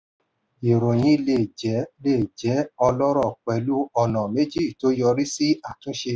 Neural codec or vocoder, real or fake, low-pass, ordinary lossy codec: none; real; none; none